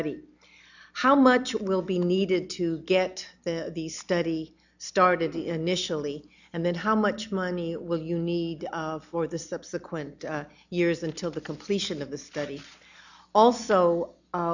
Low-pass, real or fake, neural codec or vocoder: 7.2 kHz; real; none